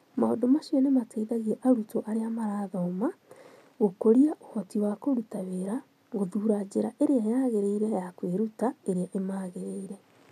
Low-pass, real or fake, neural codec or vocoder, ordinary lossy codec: 14.4 kHz; real; none; none